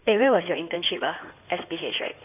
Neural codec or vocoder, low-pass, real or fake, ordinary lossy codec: codec, 16 kHz in and 24 kHz out, 2.2 kbps, FireRedTTS-2 codec; 3.6 kHz; fake; none